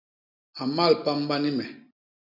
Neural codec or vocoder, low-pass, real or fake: none; 5.4 kHz; real